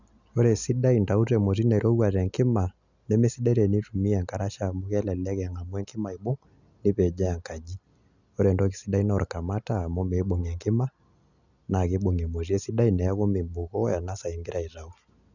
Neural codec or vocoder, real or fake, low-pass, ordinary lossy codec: none; real; 7.2 kHz; none